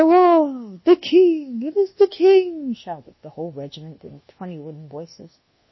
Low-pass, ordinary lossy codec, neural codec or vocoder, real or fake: 7.2 kHz; MP3, 24 kbps; codec, 24 kHz, 1.2 kbps, DualCodec; fake